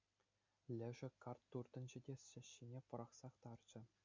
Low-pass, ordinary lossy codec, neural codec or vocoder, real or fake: 7.2 kHz; MP3, 64 kbps; none; real